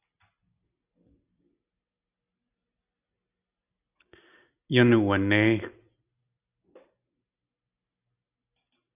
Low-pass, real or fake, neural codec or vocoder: 3.6 kHz; real; none